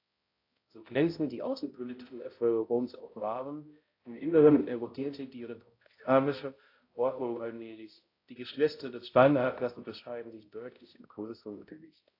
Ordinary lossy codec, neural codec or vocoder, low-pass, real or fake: AAC, 32 kbps; codec, 16 kHz, 0.5 kbps, X-Codec, HuBERT features, trained on balanced general audio; 5.4 kHz; fake